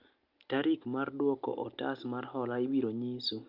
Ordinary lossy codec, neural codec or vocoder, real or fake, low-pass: Opus, 24 kbps; none; real; 5.4 kHz